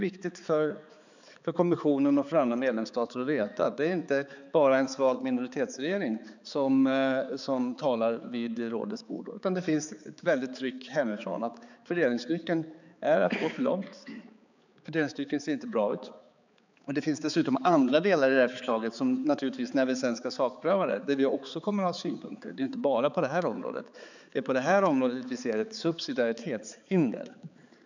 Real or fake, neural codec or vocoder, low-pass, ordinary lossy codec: fake; codec, 16 kHz, 4 kbps, X-Codec, HuBERT features, trained on balanced general audio; 7.2 kHz; none